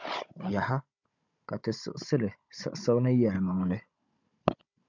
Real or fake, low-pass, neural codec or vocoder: fake; 7.2 kHz; codec, 16 kHz, 16 kbps, FunCodec, trained on Chinese and English, 50 frames a second